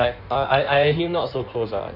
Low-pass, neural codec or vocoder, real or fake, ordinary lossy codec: 5.4 kHz; codec, 16 kHz in and 24 kHz out, 1.1 kbps, FireRedTTS-2 codec; fake; none